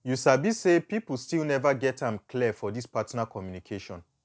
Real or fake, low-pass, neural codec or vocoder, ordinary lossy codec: real; none; none; none